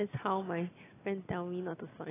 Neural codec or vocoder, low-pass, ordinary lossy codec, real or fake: none; 3.6 kHz; AAC, 16 kbps; real